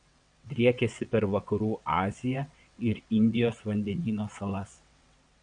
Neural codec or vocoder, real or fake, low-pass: vocoder, 22.05 kHz, 80 mel bands, WaveNeXt; fake; 9.9 kHz